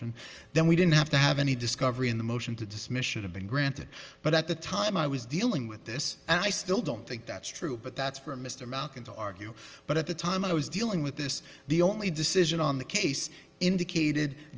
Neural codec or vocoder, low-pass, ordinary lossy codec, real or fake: none; 7.2 kHz; Opus, 24 kbps; real